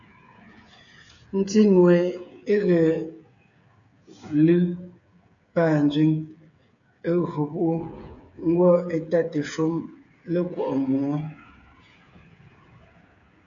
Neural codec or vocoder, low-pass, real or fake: codec, 16 kHz, 8 kbps, FreqCodec, smaller model; 7.2 kHz; fake